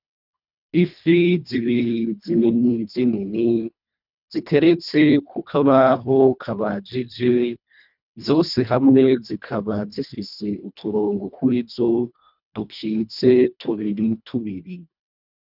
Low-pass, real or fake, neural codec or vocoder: 5.4 kHz; fake; codec, 24 kHz, 1.5 kbps, HILCodec